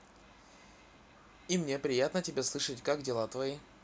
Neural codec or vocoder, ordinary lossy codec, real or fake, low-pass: none; none; real; none